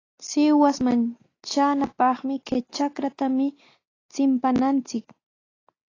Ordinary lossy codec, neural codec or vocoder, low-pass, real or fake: AAC, 32 kbps; none; 7.2 kHz; real